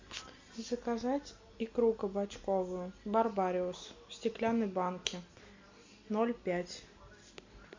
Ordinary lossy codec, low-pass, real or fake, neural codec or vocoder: MP3, 64 kbps; 7.2 kHz; real; none